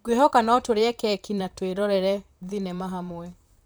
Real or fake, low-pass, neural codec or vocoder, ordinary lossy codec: fake; none; vocoder, 44.1 kHz, 128 mel bands, Pupu-Vocoder; none